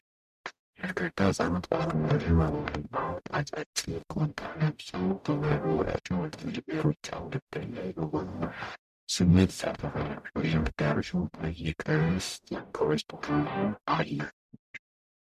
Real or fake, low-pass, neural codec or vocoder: fake; 14.4 kHz; codec, 44.1 kHz, 0.9 kbps, DAC